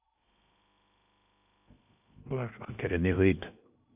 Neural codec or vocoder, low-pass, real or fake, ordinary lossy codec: codec, 16 kHz in and 24 kHz out, 0.8 kbps, FocalCodec, streaming, 65536 codes; 3.6 kHz; fake; AAC, 32 kbps